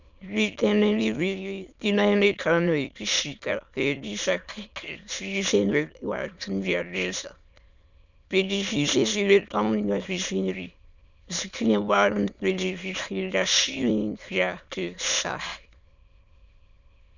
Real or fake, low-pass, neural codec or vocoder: fake; 7.2 kHz; autoencoder, 22.05 kHz, a latent of 192 numbers a frame, VITS, trained on many speakers